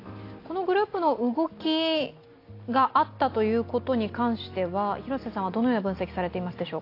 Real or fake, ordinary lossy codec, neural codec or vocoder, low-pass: real; AAC, 32 kbps; none; 5.4 kHz